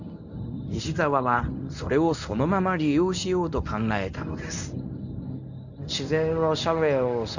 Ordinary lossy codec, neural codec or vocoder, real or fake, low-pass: none; codec, 24 kHz, 0.9 kbps, WavTokenizer, medium speech release version 1; fake; 7.2 kHz